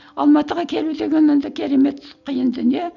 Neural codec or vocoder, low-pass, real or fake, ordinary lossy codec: none; 7.2 kHz; real; none